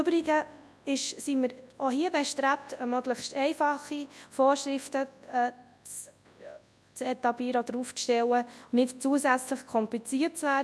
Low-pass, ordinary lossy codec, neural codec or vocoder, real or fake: none; none; codec, 24 kHz, 0.9 kbps, WavTokenizer, large speech release; fake